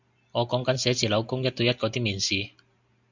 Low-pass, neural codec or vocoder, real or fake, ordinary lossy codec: 7.2 kHz; none; real; MP3, 64 kbps